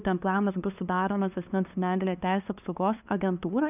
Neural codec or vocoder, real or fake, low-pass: codec, 16 kHz, 2 kbps, FunCodec, trained on LibriTTS, 25 frames a second; fake; 3.6 kHz